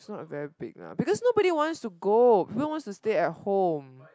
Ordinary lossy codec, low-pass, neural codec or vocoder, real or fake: none; none; none; real